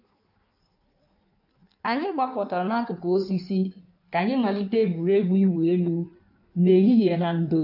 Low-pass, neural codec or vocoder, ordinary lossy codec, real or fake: 5.4 kHz; codec, 16 kHz in and 24 kHz out, 1.1 kbps, FireRedTTS-2 codec; none; fake